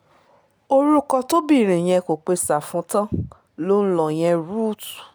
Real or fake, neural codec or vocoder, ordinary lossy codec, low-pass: real; none; none; none